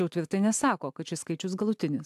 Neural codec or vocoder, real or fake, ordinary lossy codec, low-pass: none; real; AAC, 96 kbps; 14.4 kHz